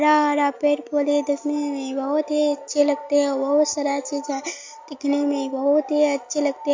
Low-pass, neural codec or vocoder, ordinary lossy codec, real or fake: 7.2 kHz; none; MP3, 48 kbps; real